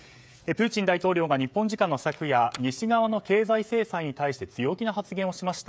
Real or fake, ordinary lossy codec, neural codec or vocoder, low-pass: fake; none; codec, 16 kHz, 16 kbps, FreqCodec, smaller model; none